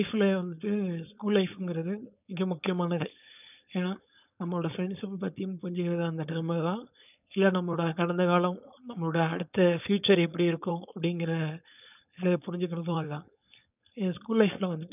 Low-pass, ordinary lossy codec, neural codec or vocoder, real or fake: 3.6 kHz; none; codec, 16 kHz, 4.8 kbps, FACodec; fake